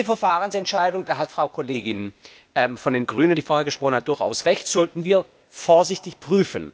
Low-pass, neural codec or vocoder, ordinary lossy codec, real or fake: none; codec, 16 kHz, 0.8 kbps, ZipCodec; none; fake